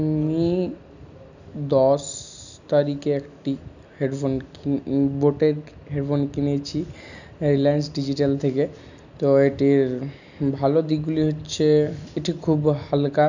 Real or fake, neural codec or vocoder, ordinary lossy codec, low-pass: real; none; none; 7.2 kHz